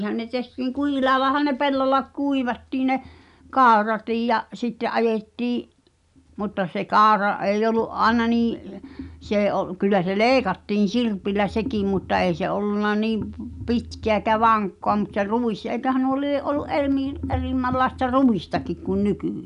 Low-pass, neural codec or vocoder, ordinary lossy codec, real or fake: 10.8 kHz; none; none; real